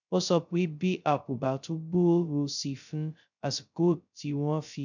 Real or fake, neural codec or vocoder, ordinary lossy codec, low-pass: fake; codec, 16 kHz, 0.2 kbps, FocalCodec; none; 7.2 kHz